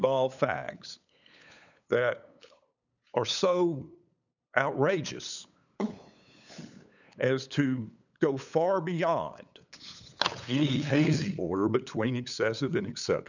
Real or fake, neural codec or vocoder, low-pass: fake; codec, 16 kHz, 8 kbps, FunCodec, trained on LibriTTS, 25 frames a second; 7.2 kHz